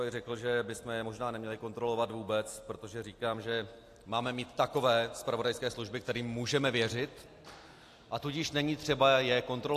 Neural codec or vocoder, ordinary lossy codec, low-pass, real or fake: none; AAC, 64 kbps; 14.4 kHz; real